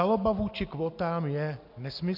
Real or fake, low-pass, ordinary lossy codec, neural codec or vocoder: real; 5.4 kHz; MP3, 48 kbps; none